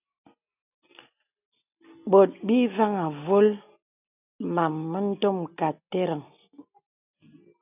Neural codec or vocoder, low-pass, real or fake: none; 3.6 kHz; real